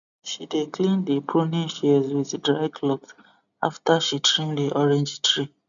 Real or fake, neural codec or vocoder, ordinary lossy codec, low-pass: real; none; none; 7.2 kHz